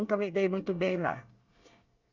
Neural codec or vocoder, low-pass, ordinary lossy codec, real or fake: codec, 24 kHz, 1 kbps, SNAC; 7.2 kHz; none; fake